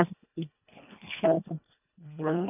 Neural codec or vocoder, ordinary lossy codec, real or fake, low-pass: codec, 24 kHz, 1.5 kbps, HILCodec; none; fake; 3.6 kHz